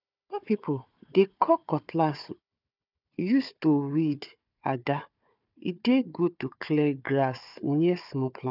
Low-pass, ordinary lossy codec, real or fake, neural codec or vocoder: 5.4 kHz; none; fake; codec, 16 kHz, 4 kbps, FunCodec, trained on Chinese and English, 50 frames a second